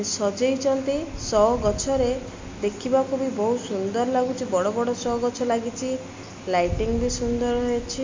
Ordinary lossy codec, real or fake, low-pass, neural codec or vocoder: MP3, 48 kbps; real; 7.2 kHz; none